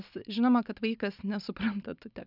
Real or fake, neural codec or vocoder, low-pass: real; none; 5.4 kHz